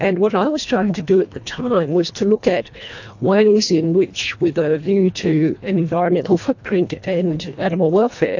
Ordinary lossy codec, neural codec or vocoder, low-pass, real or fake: AAC, 48 kbps; codec, 24 kHz, 1.5 kbps, HILCodec; 7.2 kHz; fake